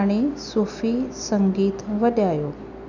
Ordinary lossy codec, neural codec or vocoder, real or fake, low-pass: none; none; real; 7.2 kHz